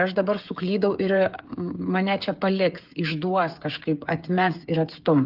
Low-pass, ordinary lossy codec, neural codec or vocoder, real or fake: 5.4 kHz; Opus, 32 kbps; codec, 16 kHz, 8 kbps, FreqCodec, smaller model; fake